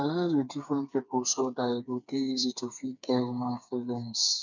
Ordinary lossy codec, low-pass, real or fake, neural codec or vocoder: none; 7.2 kHz; fake; codec, 16 kHz, 4 kbps, FreqCodec, smaller model